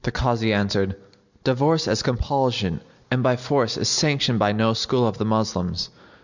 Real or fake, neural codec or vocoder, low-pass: real; none; 7.2 kHz